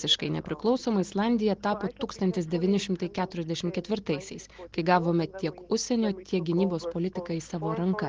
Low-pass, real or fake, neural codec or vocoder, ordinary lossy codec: 7.2 kHz; real; none; Opus, 16 kbps